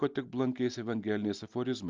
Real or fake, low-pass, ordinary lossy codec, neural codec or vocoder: real; 7.2 kHz; Opus, 32 kbps; none